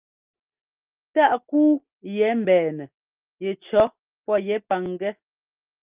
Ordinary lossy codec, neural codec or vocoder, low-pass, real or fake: Opus, 24 kbps; none; 3.6 kHz; real